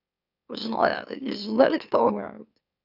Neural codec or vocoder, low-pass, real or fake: autoencoder, 44.1 kHz, a latent of 192 numbers a frame, MeloTTS; 5.4 kHz; fake